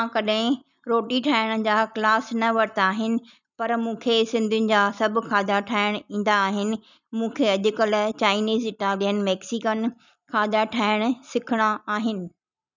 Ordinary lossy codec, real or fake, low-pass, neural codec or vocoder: none; real; 7.2 kHz; none